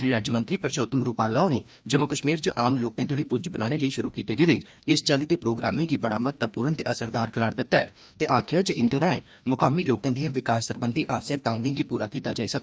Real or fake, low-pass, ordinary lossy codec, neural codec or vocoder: fake; none; none; codec, 16 kHz, 1 kbps, FreqCodec, larger model